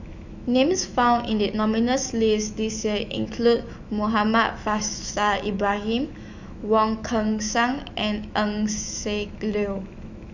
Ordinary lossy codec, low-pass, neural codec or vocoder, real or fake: none; 7.2 kHz; autoencoder, 48 kHz, 128 numbers a frame, DAC-VAE, trained on Japanese speech; fake